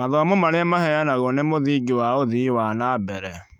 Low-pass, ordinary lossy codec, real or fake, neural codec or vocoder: 19.8 kHz; none; fake; autoencoder, 48 kHz, 128 numbers a frame, DAC-VAE, trained on Japanese speech